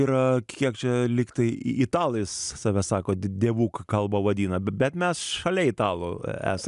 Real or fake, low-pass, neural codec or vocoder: real; 10.8 kHz; none